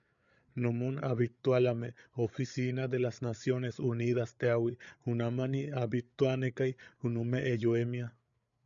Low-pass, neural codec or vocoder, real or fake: 7.2 kHz; codec, 16 kHz, 8 kbps, FreqCodec, larger model; fake